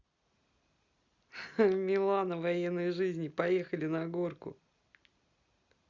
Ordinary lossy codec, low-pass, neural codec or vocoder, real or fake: Opus, 64 kbps; 7.2 kHz; none; real